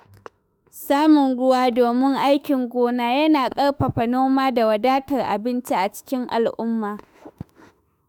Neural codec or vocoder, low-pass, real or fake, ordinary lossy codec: autoencoder, 48 kHz, 32 numbers a frame, DAC-VAE, trained on Japanese speech; none; fake; none